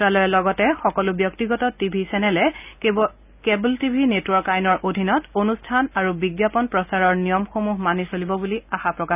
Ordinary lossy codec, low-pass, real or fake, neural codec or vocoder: none; 3.6 kHz; real; none